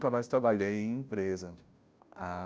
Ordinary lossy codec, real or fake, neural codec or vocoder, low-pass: none; fake; codec, 16 kHz, 0.5 kbps, FunCodec, trained on Chinese and English, 25 frames a second; none